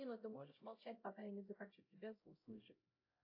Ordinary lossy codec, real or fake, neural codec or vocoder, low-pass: AAC, 32 kbps; fake; codec, 16 kHz, 0.5 kbps, X-Codec, HuBERT features, trained on LibriSpeech; 5.4 kHz